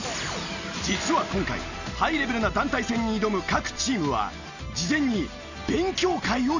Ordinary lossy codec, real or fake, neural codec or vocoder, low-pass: none; real; none; 7.2 kHz